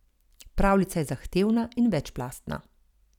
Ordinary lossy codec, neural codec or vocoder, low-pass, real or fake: none; vocoder, 44.1 kHz, 128 mel bands every 256 samples, BigVGAN v2; 19.8 kHz; fake